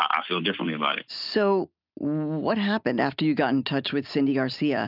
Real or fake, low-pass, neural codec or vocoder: real; 5.4 kHz; none